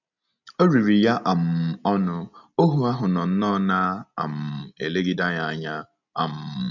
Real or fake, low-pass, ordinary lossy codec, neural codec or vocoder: real; 7.2 kHz; none; none